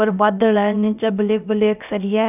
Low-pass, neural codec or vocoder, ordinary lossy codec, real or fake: 3.6 kHz; codec, 16 kHz, about 1 kbps, DyCAST, with the encoder's durations; none; fake